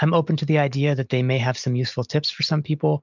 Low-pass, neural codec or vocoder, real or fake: 7.2 kHz; none; real